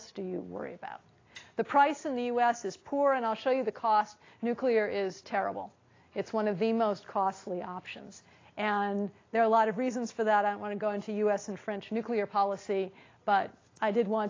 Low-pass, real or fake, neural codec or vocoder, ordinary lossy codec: 7.2 kHz; real; none; AAC, 32 kbps